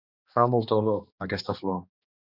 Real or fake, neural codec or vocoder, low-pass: fake; codec, 16 kHz, 2 kbps, X-Codec, HuBERT features, trained on balanced general audio; 5.4 kHz